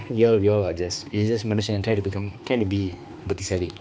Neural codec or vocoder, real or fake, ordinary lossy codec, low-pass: codec, 16 kHz, 2 kbps, X-Codec, HuBERT features, trained on balanced general audio; fake; none; none